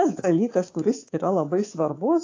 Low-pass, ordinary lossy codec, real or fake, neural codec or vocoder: 7.2 kHz; AAC, 32 kbps; fake; codec, 24 kHz, 3.1 kbps, DualCodec